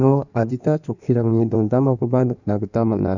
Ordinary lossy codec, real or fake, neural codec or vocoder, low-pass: none; fake; codec, 16 kHz in and 24 kHz out, 1.1 kbps, FireRedTTS-2 codec; 7.2 kHz